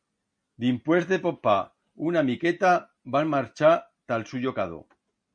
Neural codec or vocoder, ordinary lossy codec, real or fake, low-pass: none; MP3, 48 kbps; real; 9.9 kHz